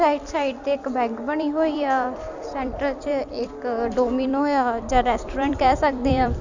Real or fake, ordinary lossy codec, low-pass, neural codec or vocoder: fake; Opus, 64 kbps; 7.2 kHz; vocoder, 44.1 kHz, 128 mel bands every 256 samples, BigVGAN v2